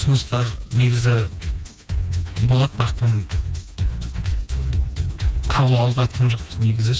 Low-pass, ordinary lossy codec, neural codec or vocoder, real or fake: none; none; codec, 16 kHz, 2 kbps, FreqCodec, smaller model; fake